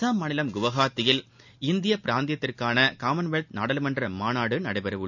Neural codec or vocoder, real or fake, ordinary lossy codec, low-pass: none; real; none; 7.2 kHz